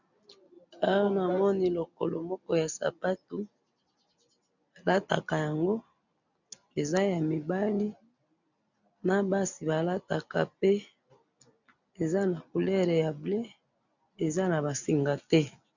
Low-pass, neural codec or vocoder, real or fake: 7.2 kHz; none; real